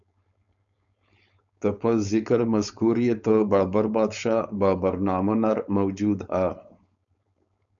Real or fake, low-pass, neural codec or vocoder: fake; 7.2 kHz; codec, 16 kHz, 4.8 kbps, FACodec